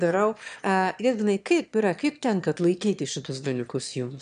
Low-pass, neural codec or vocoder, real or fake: 9.9 kHz; autoencoder, 22.05 kHz, a latent of 192 numbers a frame, VITS, trained on one speaker; fake